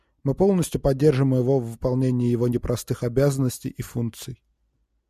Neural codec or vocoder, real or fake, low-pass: none; real; 14.4 kHz